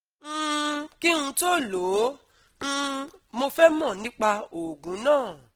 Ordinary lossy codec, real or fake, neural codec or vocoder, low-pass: Opus, 16 kbps; real; none; 19.8 kHz